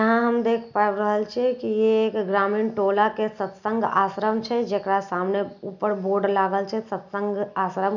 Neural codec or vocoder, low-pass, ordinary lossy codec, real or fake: none; 7.2 kHz; none; real